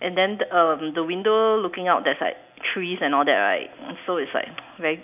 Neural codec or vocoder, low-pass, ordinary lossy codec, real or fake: none; 3.6 kHz; none; real